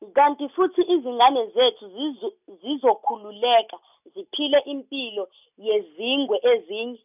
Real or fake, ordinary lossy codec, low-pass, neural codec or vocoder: real; none; 3.6 kHz; none